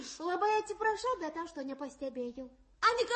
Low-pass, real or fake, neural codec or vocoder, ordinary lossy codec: 10.8 kHz; fake; codec, 44.1 kHz, 7.8 kbps, DAC; MP3, 32 kbps